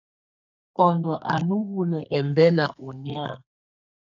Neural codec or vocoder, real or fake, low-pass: codec, 32 kHz, 1.9 kbps, SNAC; fake; 7.2 kHz